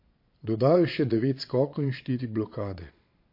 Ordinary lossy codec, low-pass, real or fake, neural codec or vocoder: MP3, 32 kbps; 5.4 kHz; fake; vocoder, 22.05 kHz, 80 mel bands, Vocos